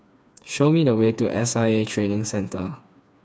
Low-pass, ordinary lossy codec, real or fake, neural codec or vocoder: none; none; fake; codec, 16 kHz, 4 kbps, FreqCodec, smaller model